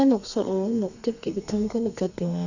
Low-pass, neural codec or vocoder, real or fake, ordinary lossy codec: 7.2 kHz; codec, 32 kHz, 1.9 kbps, SNAC; fake; none